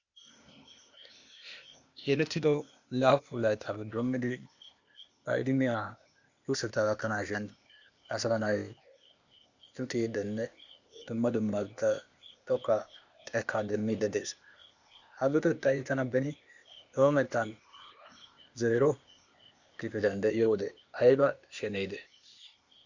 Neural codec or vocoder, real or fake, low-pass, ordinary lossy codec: codec, 16 kHz, 0.8 kbps, ZipCodec; fake; 7.2 kHz; Opus, 64 kbps